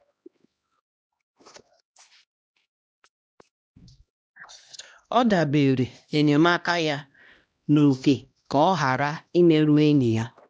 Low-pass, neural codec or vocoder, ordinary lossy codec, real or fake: none; codec, 16 kHz, 1 kbps, X-Codec, HuBERT features, trained on LibriSpeech; none; fake